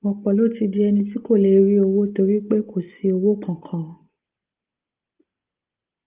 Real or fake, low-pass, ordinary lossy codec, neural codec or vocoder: real; 3.6 kHz; Opus, 32 kbps; none